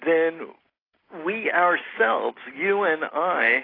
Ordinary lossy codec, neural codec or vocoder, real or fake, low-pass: AAC, 24 kbps; none; real; 5.4 kHz